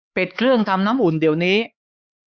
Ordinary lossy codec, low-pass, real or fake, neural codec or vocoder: Opus, 64 kbps; 7.2 kHz; fake; codec, 16 kHz, 4 kbps, X-Codec, HuBERT features, trained on LibriSpeech